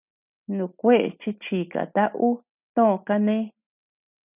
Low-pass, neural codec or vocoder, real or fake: 3.6 kHz; none; real